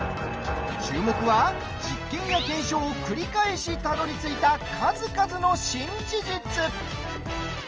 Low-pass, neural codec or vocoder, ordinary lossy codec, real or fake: 7.2 kHz; none; Opus, 24 kbps; real